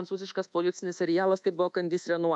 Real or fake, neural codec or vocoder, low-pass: fake; codec, 24 kHz, 1.2 kbps, DualCodec; 10.8 kHz